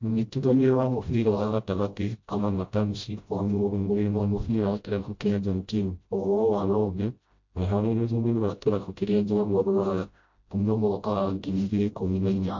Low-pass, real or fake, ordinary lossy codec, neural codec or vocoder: 7.2 kHz; fake; MP3, 48 kbps; codec, 16 kHz, 0.5 kbps, FreqCodec, smaller model